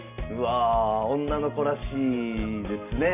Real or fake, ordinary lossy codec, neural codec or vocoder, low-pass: real; none; none; 3.6 kHz